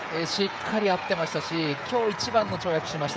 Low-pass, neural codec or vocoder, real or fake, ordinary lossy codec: none; codec, 16 kHz, 16 kbps, FreqCodec, smaller model; fake; none